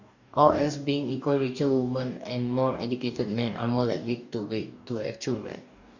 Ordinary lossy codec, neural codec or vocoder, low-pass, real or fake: none; codec, 44.1 kHz, 2.6 kbps, DAC; 7.2 kHz; fake